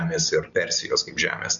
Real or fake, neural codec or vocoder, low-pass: real; none; 7.2 kHz